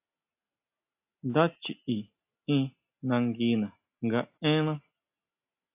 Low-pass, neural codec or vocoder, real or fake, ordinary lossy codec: 3.6 kHz; none; real; AAC, 32 kbps